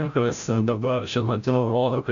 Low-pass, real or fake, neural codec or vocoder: 7.2 kHz; fake; codec, 16 kHz, 0.5 kbps, FreqCodec, larger model